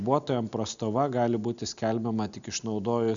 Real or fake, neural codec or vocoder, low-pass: real; none; 7.2 kHz